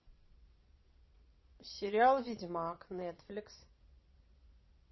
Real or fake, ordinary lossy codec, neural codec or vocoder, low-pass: fake; MP3, 24 kbps; vocoder, 22.05 kHz, 80 mel bands, Vocos; 7.2 kHz